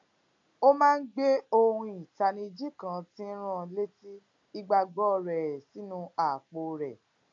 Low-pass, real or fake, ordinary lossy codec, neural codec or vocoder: 7.2 kHz; real; AAC, 48 kbps; none